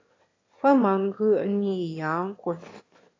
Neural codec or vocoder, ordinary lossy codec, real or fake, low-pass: autoencoder, 22.05 kHz, a latent of 192 numbers a frame, VITS, trained on one speaker; AAC, 32 kbps; fake; 7.2 kHz